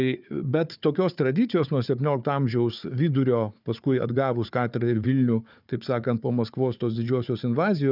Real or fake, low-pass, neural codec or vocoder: fake; 5.4 kHz; codec, 16 kHz, 4 kbps, FunCodec, trained on Chinese and English, 50 frames a second